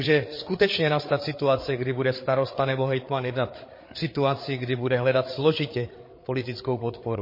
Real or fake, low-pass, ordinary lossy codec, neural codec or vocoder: fake; 5.4 kHz; MP3, 24 kbps; codec, 16 kHz, 8 kbps, FreqCodec, larger model